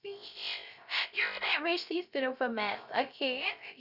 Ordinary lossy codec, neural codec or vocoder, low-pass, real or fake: none; codec, 16 kHz, 0.3 kbps, FocalCodec; 5.4 kHz; fake